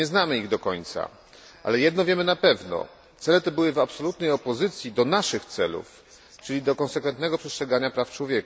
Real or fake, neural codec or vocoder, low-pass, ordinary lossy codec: real; none; none; none